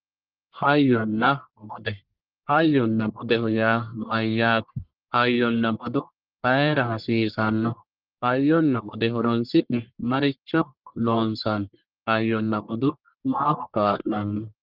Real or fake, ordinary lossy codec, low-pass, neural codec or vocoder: fake; Opus, 24 kbps; 5.4 kHz; codec, 44.1 kHz, 1.7 kbps, Pupu-Codec